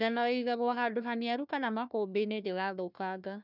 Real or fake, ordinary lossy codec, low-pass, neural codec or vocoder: fake; none; 5.4 kHz; codec, 16 kHz, 1 kbps, FunCodec, trained on Chinese and English, 50 frames a second